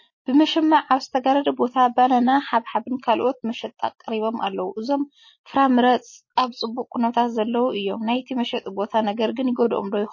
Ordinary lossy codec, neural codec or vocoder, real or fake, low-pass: MP3, 32 kbps; none; real; 7.2 kHz